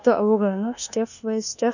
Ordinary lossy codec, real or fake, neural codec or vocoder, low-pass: none; fake; codec, 24 kHz, 1.2 kbps, DualCodec; 7.2 kHz